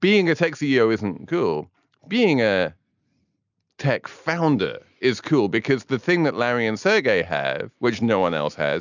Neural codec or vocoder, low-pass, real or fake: none; 7.2 kHz; real